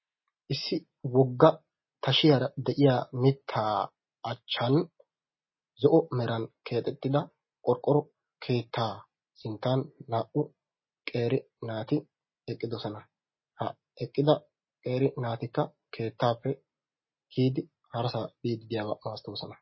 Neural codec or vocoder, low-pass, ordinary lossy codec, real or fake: none; 7.2 kHz; MP3, 24 kbps; real